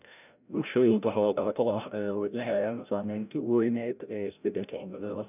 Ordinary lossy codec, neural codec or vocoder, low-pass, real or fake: none; codec, 16 kHz, 0.5 kbps, FreqCodec, larger model; 3.6 kHz; fake